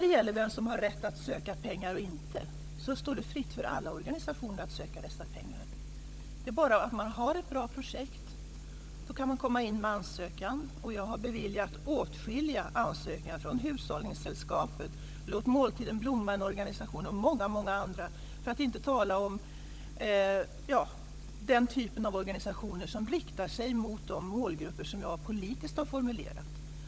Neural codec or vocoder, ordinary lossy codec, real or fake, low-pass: codec, 16 kHz, 16 kbps, FunCodec, trained on LibriTTS, 50 frames a second; none; fake; none